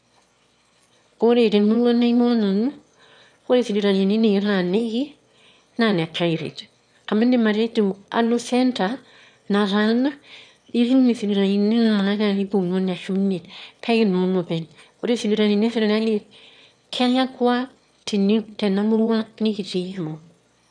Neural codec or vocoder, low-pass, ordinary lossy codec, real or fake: autoencoder, 22.05 kHz, a latent of 192 numbers a frame, VITS, trained on one speaker; 9.9 kHz; none; fake